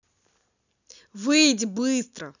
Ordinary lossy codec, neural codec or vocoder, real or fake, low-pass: none; none; real; 7.2 kHz